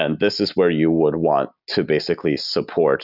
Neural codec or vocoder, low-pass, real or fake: none; 5.4 kHz; real